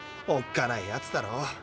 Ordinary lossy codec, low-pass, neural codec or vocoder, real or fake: none; none; none; real